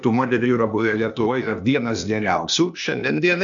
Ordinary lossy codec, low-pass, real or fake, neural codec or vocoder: MP3, 96 kbps; 7.2 kHz; fake; codec, 16 kHz, 0.8 kbps, ZipCodec